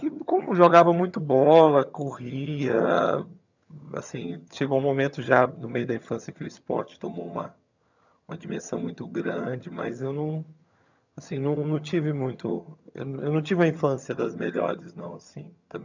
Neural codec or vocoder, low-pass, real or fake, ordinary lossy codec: vocoder, 22.05 kHz, 80 mel bands, HiFi-GAN; 7.2 kHz; fake; none